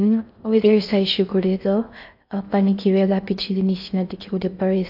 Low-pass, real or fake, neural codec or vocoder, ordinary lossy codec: 5.4 kHz; fake; codec, 16 kHz in and 24 kHz out, 0.6 kbps, FocalCodec, streaming, 4096 codes; AAC, 48 kbps